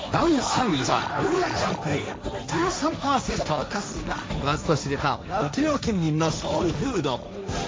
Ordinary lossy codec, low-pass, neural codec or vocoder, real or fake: none; none; codec, 16 kHz, 1.1 kbps, Voila-Tokenizer; fake